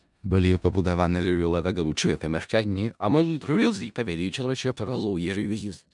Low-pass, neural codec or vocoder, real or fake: 10.8 kHz; codec, 16 kHz in and 24 kHz out, 0.4 kbps, LongCat-Audio-Codec, four codebook decoder; fake